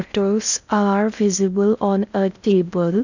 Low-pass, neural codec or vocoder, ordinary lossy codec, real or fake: 7.2 kHz; codec, 16 kHz in and 24 kHz out, 0.6 kbps, FocalCodec, streaming, 2048 codes; none; fake